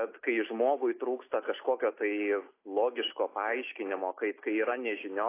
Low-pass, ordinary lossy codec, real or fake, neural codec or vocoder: 3.6 kHz; AAC, 24 kbps; real; none